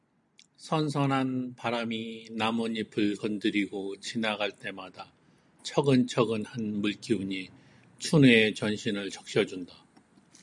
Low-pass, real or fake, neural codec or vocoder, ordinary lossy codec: 10.8 kHz; real; none; MP3, 96 kbps